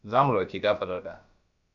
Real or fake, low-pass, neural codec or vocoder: fake; 7.2 kHz; codec, 16 kHz, about 1 kbps, DyCAST, with the encoder's durations